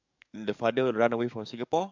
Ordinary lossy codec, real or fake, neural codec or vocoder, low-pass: MP3, 64 kbps; fake; codec, 44.1 kHz, 7.8 kbps, DAC; 7.2 kHz